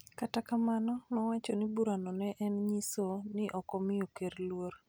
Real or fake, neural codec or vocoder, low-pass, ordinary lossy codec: real; none; none; none